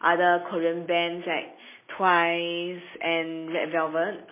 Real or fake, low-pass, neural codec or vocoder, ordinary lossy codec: real; 3.6 kHz; none; MP3, 16 kbps